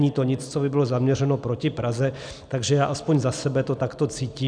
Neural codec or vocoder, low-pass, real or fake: none; 9.9 kHz; real